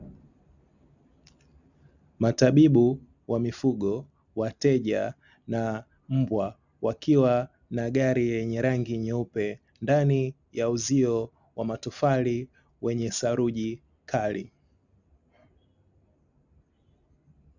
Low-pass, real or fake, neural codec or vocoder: 7.2 kHz; real; none